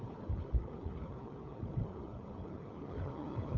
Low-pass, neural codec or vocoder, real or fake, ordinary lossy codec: 7.2 kHz; codec, 16 kHz, 4 kbps, FunCodec, trained on LibriTTS, 50 frames a second; fake; none